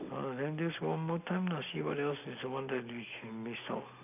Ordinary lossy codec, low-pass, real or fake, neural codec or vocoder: none; 3.6 kHz; real; none